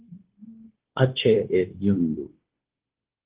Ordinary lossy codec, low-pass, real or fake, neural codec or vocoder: Opus, 16 kbps; 3.6 kHz; fake; codec, 16 kHz, 0.9 kbps, LongCat-Audio-Codec